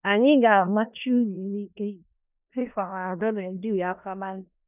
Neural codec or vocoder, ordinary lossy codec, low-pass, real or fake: codec, 16 kHz in and 24 kHz out, 0.4 kbps, LongCat-Audio-Codec, four codebook decoder; none; 3.6 kHz; fake